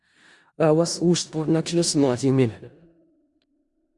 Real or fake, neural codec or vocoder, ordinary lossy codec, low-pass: fake; codec, 16 kHz in and 24 kHz out, 0.4 kbps, LongCat-Audio-Codec, four codebook decoder; Opus, 64 kbps; 10.8 kHz